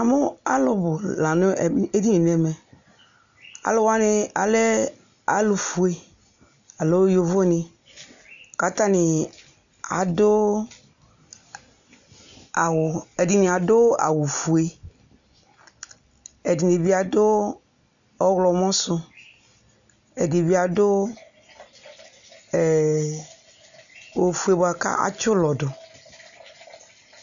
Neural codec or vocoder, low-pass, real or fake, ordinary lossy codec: none; 7.2 kHz; real; MP3, 96 kbps